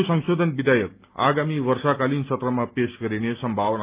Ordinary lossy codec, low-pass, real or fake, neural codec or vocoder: Opus, 32 kbps; 3.6 kHz; real; none